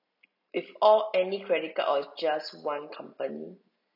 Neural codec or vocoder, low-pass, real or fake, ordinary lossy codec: none; 5.4 kHz; real; none